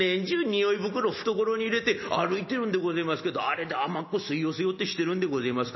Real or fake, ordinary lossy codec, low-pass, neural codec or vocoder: real; MP3, 24 kbps; 7.2 kHz; none